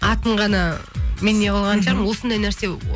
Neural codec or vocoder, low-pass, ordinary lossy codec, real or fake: none; none; none; real